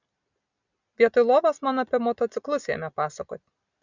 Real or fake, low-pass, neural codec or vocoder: real; 7.2 kHz; none